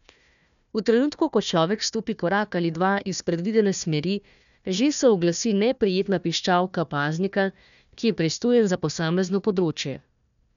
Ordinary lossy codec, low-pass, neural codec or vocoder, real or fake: none; 7.2 kHz; codec, 16 kHz, 1 kbps, FunCodec, trained on Chinese and English, 50 frames a second; fake